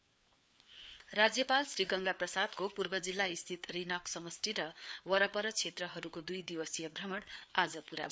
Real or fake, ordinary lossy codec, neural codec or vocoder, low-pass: fake; none; codec, 16 kHz, 4 kbps, FreqCodec, larger model; none